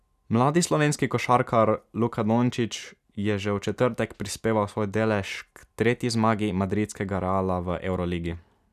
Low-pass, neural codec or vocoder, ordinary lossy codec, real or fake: 14.4 kHz; none; none; real